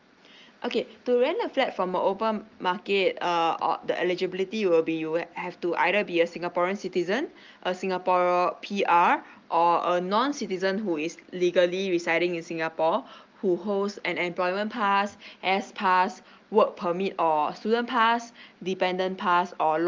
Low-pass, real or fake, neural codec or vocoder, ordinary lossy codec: 7.2 kHz; real; none; Opus, 32 kbps